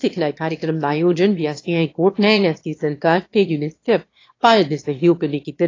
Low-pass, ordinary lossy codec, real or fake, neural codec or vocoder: 7.2 kHz; AAC, 32 kbps; fake; autoencoder, 22.05 kHz, a latent of 192 numbers a frame, VITS, trained on one speaker